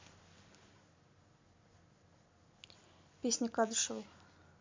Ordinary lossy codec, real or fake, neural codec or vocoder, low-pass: MP3, 64 kbps; real; none; 7.2 kHz